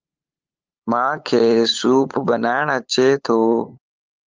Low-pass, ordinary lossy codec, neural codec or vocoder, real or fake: 7.2 kHz; Opus, 32 kbps; codec, 16 kHz, 8 kbps, FunCodec, trained on LibriTTS, 25 frames a second; fake